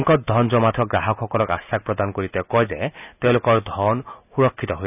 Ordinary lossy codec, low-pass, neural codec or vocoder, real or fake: none; 3.6 kHz; none; real